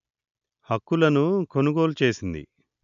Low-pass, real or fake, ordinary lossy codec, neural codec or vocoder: 7.2 kHz; real; none; none